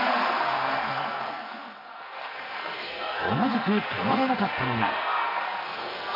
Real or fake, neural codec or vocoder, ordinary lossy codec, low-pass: fake; codec, 44.1 kHz, 2.6 kbps, SNAC; none; 5.4 kHz